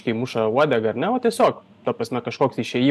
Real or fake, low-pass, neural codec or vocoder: real; 14.4 kHz; none